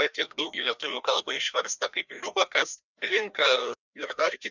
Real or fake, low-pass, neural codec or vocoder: fake; 7.2 kHz; codec, 16 kHz, 1 kbps, FreqCodec, larger model